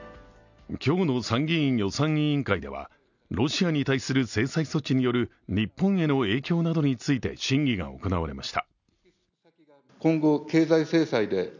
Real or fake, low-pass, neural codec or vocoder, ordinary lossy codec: real; 7.2 kHz; none; none